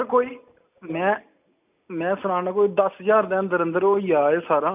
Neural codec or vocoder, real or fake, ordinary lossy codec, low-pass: none; real; none; 3.6 kHz